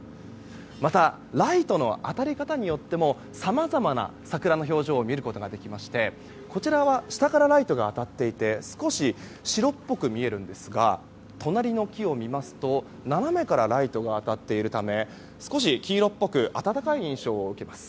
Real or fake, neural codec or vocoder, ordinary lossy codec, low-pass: real; none; none; none